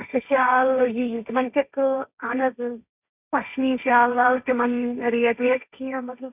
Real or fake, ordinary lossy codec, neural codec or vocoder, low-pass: fake; none; codec, 16 kHz, 1.1 kbps, Voila-Tokenizer; 3.6 kHz